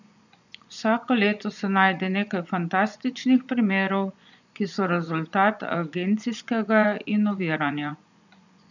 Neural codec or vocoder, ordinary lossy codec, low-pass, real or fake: vocoder, 24 kHz, 100 mel bands, Vocos; none; 7.2 kHz; fake